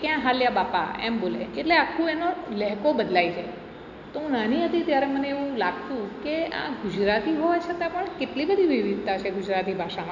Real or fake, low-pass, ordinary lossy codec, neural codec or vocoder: real; 7.2 kHz; none; none